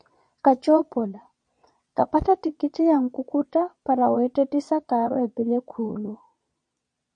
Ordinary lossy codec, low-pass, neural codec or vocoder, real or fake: MP3, 48 kbps; 9.9 kHz; vocoder, 22.05 kHz, 80 mel bands, Vocos; fake